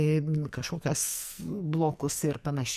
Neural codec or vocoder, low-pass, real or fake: codec, 44.1 kHz, 2.6 kbps, SNAC; 14.4 kHz; fake